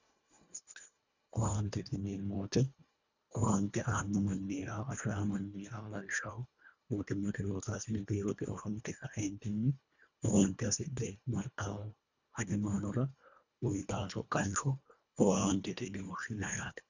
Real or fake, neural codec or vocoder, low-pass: fake; codec, 24 kHz, 1.5 kbps, HILCodec; 7.2 kHz